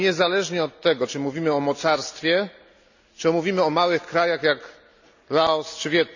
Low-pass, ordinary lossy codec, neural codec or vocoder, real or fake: 7.2 kHz; none; none; real